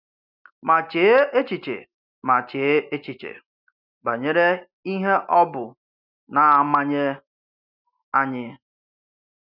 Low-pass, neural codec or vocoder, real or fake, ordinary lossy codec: 5.4 kHz; none; real; none